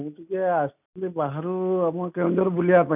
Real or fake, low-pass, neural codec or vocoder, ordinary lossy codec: real; 3.6 kHz; none; none